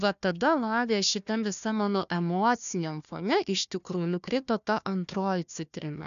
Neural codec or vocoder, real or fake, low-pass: codec, 16 kHz, 1 kbps, FunCodec, trained on Chinese and English, 50 frames a second; fake; 7.2 kHz